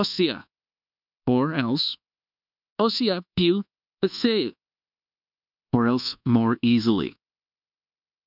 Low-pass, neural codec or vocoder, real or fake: 5.4 kHz; codec, 24 kHz, 1.2 kbps, DualCodec; fake